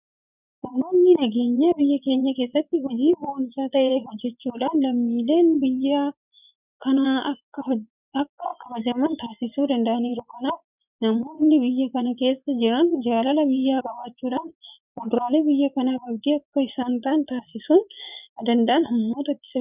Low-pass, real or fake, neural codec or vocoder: 3.6 kHz; fake; vocoder, 24 kHz, 100 mel bands, Vocos